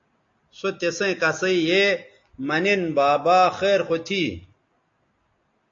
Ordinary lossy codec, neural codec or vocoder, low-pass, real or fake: AAC, 48 kbps; none; 7.2 kHz; real